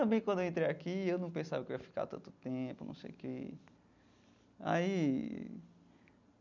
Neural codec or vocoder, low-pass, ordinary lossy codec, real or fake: none; 7.2 kHz; none; real